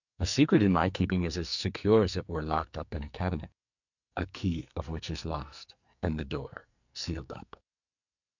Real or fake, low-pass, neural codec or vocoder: fake; 7.2 kHz; codec, 44.1 kHz, 2.6 kbps, SNAC